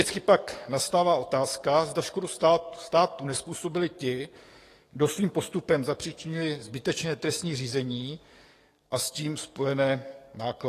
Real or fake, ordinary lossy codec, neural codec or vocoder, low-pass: fake; AAC, 48 kbps; codec, 44.1 kHz, 7.8 kbps, DAC; 14.4 kHz